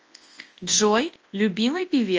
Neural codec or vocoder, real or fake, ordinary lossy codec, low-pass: codec, 24 kHz, 0.9 kbps, WavTokenizer, large speech release; fake; Opus, 24 kbps; 7.2 kHz